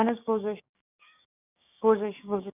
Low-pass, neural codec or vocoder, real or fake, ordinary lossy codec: 3.6 kHz; codec, 16 kHz, 6 kbps, DAC; fake; none